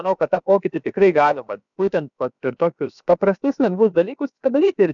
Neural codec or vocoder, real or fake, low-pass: codec, 16 kHz, about 1 kbps, DyCAST, with the encoder's durations; fake; 7.2 kHz